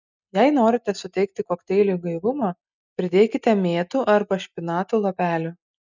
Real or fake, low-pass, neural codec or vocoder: real; 7.2 kHz; none